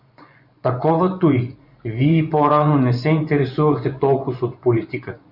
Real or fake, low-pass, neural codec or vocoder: fake; 5.4 kHz; vocoder, 24 kHz, 100 mel bands, Vocos